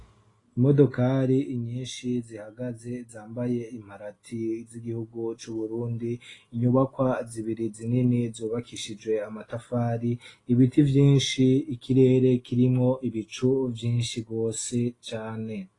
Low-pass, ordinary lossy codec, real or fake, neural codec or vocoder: 10.8 kHz; AAC, 32 kbps; real; none